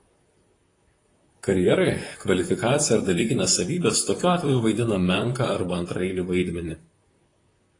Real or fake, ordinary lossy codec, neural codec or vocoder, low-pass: fake; AAC, 32 kbps; vocoder, 44.1 kHz, 128 mel bands, Pupu-Vocoder; 10.8 kHz